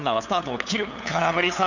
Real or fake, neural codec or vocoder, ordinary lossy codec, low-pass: fake; codec, 16 kHz, 8 kbps, FunCodec, trained on LibriTTS, 25 frames a second; none; 7.2 kHz